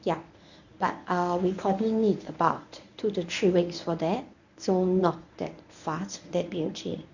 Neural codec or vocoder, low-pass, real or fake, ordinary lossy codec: codec, 24 kHz, 0.9 kbps, WavTokenizer, medium speech release version 1; 7.2 kHz; fake; none